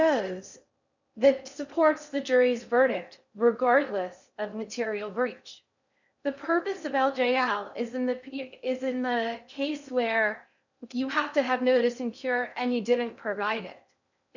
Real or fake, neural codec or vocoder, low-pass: fake; codec, 16 kHz in and 24 kHz out, 0.6 kbps, FocalCodec, streaming, 4096 codes; 7.2 kHz